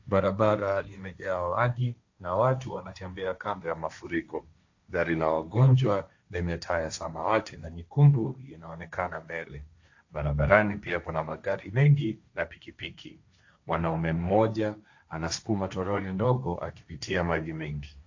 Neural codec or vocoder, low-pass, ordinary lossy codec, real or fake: codec, 16 kHz, 1.1 kbps, Voila-Tokenizer; 7.2 kHz; AAC, 48 kbps; fake